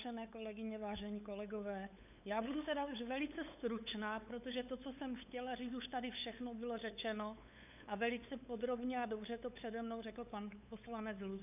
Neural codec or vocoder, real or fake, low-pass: codec, 16 kHz, 8 kbps, FunCodec, trained on LibriTTS, 25 frames a second; fake; 3.6 kHz